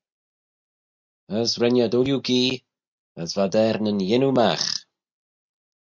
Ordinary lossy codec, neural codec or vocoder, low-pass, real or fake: MP3, 64 kbps; none; 7.2 kHz; real